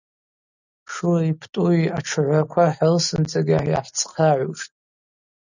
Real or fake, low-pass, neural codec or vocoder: real; 7.2 kHz; none